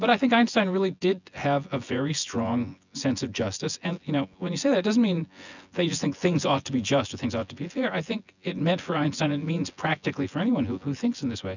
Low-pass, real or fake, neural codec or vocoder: 7.2 kHz; fake; vocoder, 24 kHz, 100 mel bands, Vocos